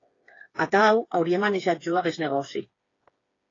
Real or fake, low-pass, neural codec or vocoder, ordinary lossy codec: fake; 7.2 kHz; codec, 16 kHz, 4 kbps, FreqCodec, smaller model; AAC, 32 kbps